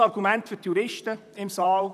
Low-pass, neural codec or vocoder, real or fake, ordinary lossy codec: 14.4 kHz; vocoder, 44.1 kHz, 128 mel bands, Pupu-Vocoder; fake; none